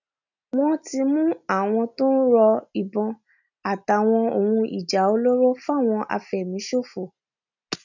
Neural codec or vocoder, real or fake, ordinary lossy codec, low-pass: none; real; none; 7.2 kHz